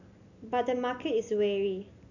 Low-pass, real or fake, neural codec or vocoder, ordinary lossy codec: 7.2 kHz; real; none; none